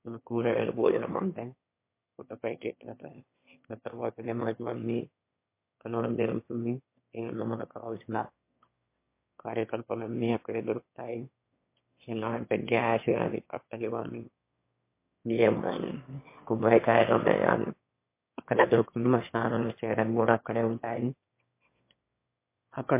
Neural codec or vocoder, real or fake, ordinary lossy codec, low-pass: autoencoder, 22.05 kHz, a latent of 192 numbers a frame, VITS, trained on one speaker; fake; MP3, 24 kbps; 3.6 kHz